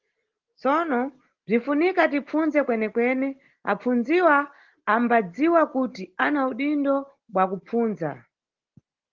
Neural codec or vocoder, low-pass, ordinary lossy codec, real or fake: none; 7.2 kHz; Opus, 16 kbps; real